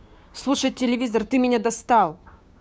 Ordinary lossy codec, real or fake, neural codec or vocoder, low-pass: none; fake; codec, 16 kHz, 6 kbps, DAC; none